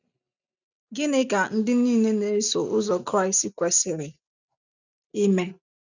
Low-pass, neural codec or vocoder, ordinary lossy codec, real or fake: 7.2 kHz; none; none; real